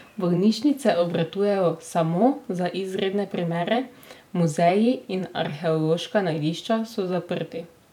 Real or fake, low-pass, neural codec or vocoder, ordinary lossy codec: fake; 19.8 kHz; vocoder, 44.1 kHz, 128 mel bands every 512 samples, BigVGAN v2; none